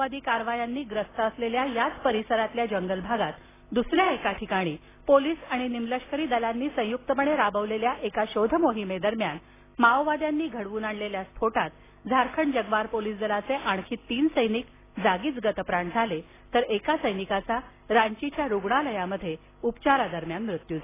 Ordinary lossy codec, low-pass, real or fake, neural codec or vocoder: AAC, 16 kbps; 3.6 kHz; real; none